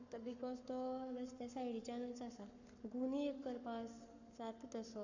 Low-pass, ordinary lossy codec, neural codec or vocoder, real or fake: 7.2 kHz; none; codec, 44.1 kHz, 7.8 kbps, Pupu-Codec; fake